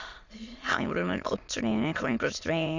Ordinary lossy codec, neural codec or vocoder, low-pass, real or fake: Opus, 64 kbps; autoencoder, 22.05 kHz, a latent of 192 numbers a frame, VITS, trained on many speakers; 7.2 kHz; fake